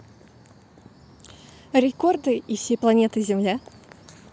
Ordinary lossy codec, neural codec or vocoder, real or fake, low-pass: none; none; real; none